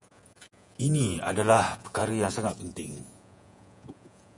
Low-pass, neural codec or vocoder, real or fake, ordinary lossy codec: 10.8 kHz; vocoder, 48 kHz, 128 mel bands, Vocos; fake; MP3, 96 kbps